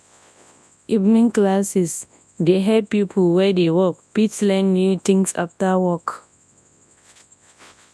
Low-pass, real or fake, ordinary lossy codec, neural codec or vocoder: none; fake; none; codec, 24 kHz, 0.9 kbps, WavTokenizer, large speech release